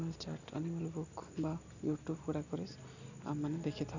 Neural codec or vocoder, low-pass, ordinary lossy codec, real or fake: none; 7.2 kHz; none; real